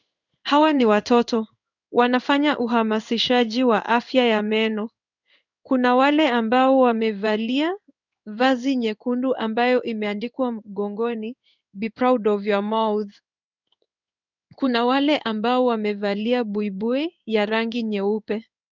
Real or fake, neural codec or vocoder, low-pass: fake; codec, 16 kHz in and 24 kHz out, 1 kbps, XY-Tokenizer; 7.2 kHz